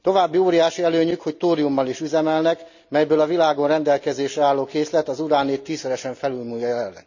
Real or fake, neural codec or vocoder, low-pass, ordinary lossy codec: real; none; 7.2 kHz; none